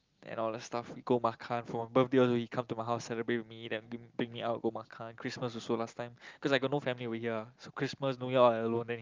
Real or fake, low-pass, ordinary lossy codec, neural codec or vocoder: real; 7.2 kHz; Opus, 24 kbps; none